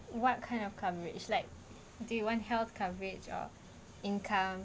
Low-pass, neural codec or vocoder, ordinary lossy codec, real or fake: none; none; none; real